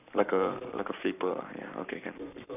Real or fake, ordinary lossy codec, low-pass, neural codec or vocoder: fake; none; 3.6 kHz; codec, 44.1 kHz, 7.8 kbps, Pupu-Codec